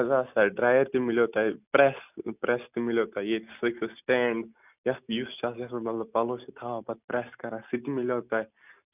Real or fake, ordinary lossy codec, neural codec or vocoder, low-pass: real; none; none; 3.6 kHz